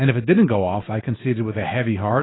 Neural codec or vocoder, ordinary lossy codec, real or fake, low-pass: none; AAC, 16 kbps; real; 7.2 kHz